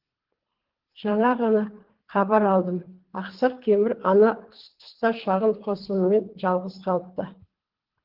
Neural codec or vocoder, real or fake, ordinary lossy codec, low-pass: codec, 24 kHz, 3 kbps, HILCodec; fake; Opus, 16 kbps; 5.4 kHz